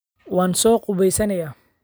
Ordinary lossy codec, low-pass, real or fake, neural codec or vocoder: none; none; real; none